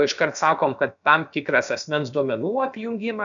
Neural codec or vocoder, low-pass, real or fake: codec, 16 kHz, about 1 kbps, DyCAST, with the encoder's durations; 7.2 kHz; fake